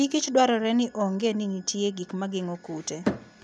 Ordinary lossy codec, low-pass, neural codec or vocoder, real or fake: none; none; none; real